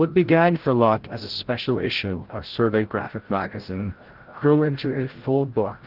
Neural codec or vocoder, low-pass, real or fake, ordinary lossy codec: codec, 16 kHz, 0.5 kbps, FreqCodec, larger model; 5.4 kHz; fake; Opus, 16 kbps